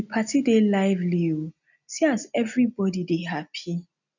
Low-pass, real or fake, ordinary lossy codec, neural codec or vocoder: 7.2 kHz; real; none; none